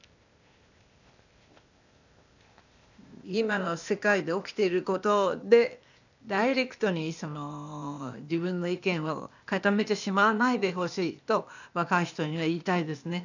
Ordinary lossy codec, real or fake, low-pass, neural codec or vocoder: none; fake; 7.2 kHz; codec, 16 kHz, 0.8 kbps, ZipCodec